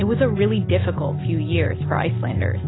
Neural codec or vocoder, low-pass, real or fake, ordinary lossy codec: none; 7.2 kHz; real; AAC, 16 kbps